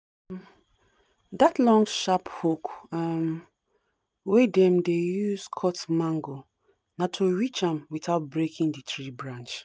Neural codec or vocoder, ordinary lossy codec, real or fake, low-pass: none; none; real; none